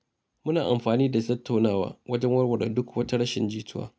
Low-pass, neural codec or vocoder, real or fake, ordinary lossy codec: none; none; real; none